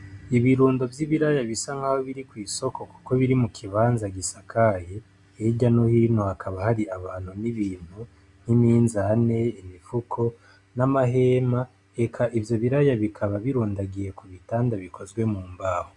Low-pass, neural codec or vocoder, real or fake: 10.8 kHz; none; real